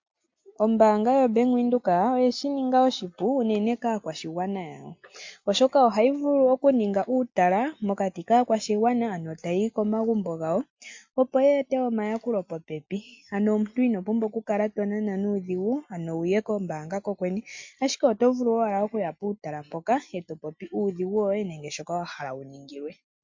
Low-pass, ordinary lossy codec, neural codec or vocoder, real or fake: 7.2 kHz; MP3, 48 kbps; none; real